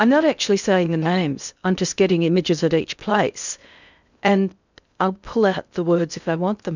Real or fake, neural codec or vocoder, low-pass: fake; codec, 16 kHz in and 24 kHz out, 0.6 kbps, FocalCodec, streaming, 2048 codes; 7.2 kHz